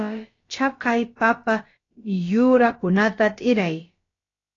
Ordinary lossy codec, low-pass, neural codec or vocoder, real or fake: AAC, 32 kbps; 7.2 kHz; codec, 16 kHz, about 1 kbps, DyCAST, with the encoder's durations; fake